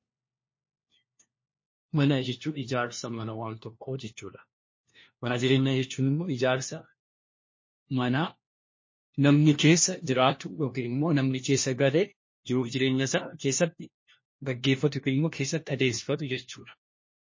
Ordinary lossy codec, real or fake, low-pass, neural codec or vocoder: MP3, 32 kbps; fake; 7.2 kHz; codec, 16 kHz, 1 kbps, FunCodec, trained on LibriTTS, 50 frames a second